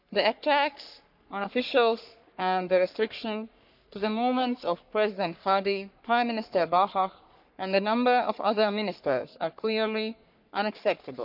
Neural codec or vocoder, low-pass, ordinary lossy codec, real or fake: codec, 44.1 kHz, 3.4 kbps, Pupu-Codec; 5.4 kHz; none; fake